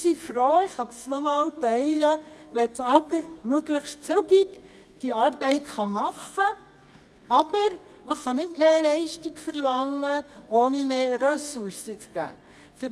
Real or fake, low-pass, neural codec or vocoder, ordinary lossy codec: fake; none; codec, 24 kHz, 0.9 kbps, WavTokenizer, medium music audio release; none